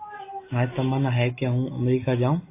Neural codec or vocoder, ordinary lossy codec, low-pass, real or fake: none; AAC, 24 kbps; 3.6 kHz; real